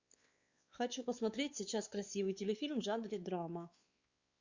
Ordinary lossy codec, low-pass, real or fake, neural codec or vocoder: Opus, 64 kbps; 7.2 kHz; fake; codec, 16 kHz, 4 kbps, X-Codec, WavLM features, trained on Multilingual LibriSpeech